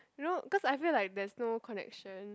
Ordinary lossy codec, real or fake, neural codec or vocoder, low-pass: none; real; none; none